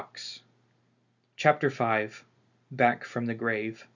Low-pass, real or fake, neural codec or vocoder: 7.2 kHz; real; none